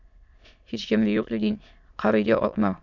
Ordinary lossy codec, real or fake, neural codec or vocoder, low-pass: MP3, 64 kbps; fake; autoencoder, 22.05 kHz, a latent of 192 numbers a frame, VITS, trained on many speakers; 7.2 kHz